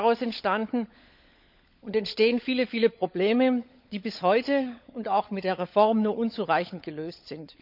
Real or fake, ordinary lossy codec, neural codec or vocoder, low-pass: fake; none; codec, 16 kHz, 16 kbps, FunCodec, trained on LibriTTS, 50 frames a second; 5.4 kHz